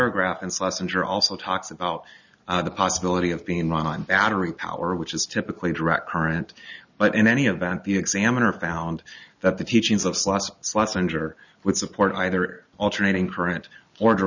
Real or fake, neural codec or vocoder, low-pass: real; none; 7.2 kHz